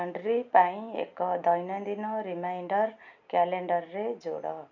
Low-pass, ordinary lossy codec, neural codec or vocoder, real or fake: 7.2 kHz; none; none; real